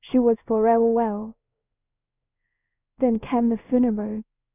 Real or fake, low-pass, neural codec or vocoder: fake; 3.6 kHz; codec, 16 kHz in and 24 kHz out, 1 kbps, XY-Tokenizer